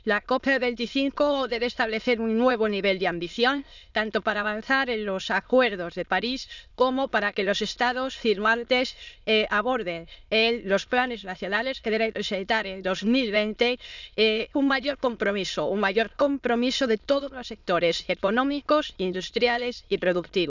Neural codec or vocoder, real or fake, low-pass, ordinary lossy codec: autoencoder, 22.05 kHz, a latent of 192 numbers a frame, VITS, trained on many speakers; fake; 7.2 kHz; none